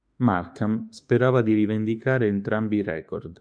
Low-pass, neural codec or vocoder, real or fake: 9.9 kHz; autoencoder, 48 kHz, 32 numbers a frame, DAC-VAE, trained on Japanese speech; fake